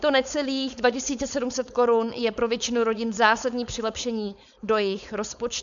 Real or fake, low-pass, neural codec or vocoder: fake; 7.2 kHz; codec, 16 kHz, 4.8 kbps, FACodec